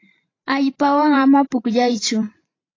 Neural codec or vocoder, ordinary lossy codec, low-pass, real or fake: vocoder, 44.1 kHz, 128 mel bands every 512 samples, BigVGAN v2; AAC, 32 kbps; 7.2 kHz; fake